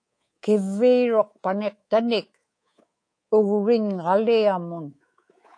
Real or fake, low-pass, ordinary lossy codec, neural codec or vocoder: fake; 9.9 kHz; AAC, 48 kbps; codec, 24 kHz, 3.1 kbps, DualCodec